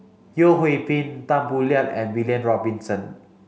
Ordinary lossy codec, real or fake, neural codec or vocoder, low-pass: none; real; none; none